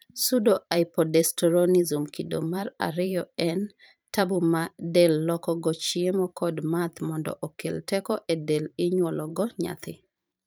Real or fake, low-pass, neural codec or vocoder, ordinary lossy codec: fake; none; vocoder, 44.1 kHz, 128 mel bands every 512 samples, BigVGAN v2; none